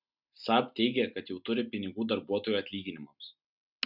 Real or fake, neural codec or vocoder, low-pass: real; none; 5.4 kHz